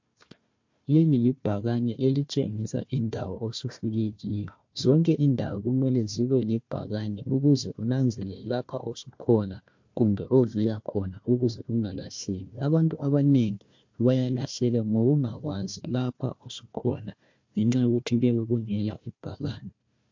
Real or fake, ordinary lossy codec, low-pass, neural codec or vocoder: fake; MP3, 48 kbps; 7.2 kHz; codec, 16 kHz, 1 kbps, FunCodec, trained on Chinese and English, 50 frames a second